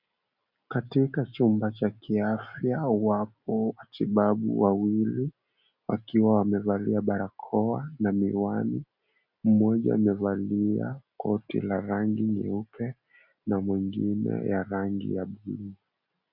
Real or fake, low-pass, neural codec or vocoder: real; 5.4 kHz; none